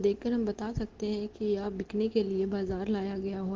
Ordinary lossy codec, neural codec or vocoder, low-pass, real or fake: Opus, 16 kbps; none; 7.2 kHz; real